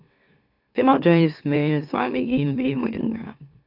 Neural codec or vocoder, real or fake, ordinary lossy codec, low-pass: autoencoder, 44.1 kHz, a latent of 192 numbers a frame, MeloTTS; fake; AAC, 48 kbps; 5.4 kHz